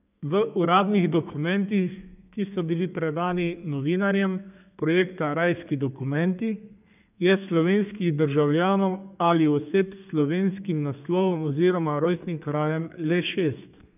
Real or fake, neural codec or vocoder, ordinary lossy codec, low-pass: fake; codec, 32 kHz, 1.9 kbps, SNAC; none; 3.6 kHz